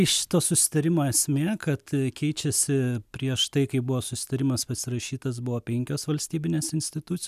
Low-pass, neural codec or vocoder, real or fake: 14.4 kHz; none; real